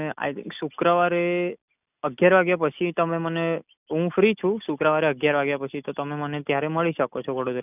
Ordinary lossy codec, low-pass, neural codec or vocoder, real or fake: none; 3.6 kHz; none; real